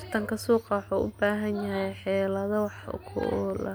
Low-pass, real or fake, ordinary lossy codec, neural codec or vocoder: none; real; none; none